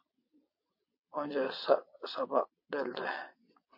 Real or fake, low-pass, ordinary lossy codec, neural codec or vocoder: fake; 5.4 kHz; MP3, 32 kbps; vocoder, 22.05 kHz, 80 mel bands, WaveNeXt